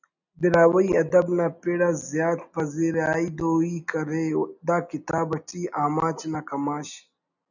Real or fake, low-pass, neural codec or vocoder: real; 7.2 kHz; none